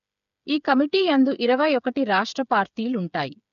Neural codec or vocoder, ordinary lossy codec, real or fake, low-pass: codec, 16 kHz, 8 kbps, FreqCodec, smaller model; none; fake; 7.2 kHz